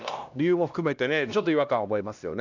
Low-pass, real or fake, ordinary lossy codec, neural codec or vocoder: 7.2 kHz; fake; none; codec, 16 kHz, 1 kbps, X-Codec, HuBERT features, trained on LibriSpeech